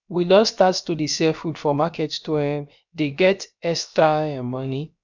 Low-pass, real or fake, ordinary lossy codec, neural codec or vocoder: 7.2 kHz; fake; none; codec, 16 kHz, about 1 kbps, DyCAST, with the encoder's durations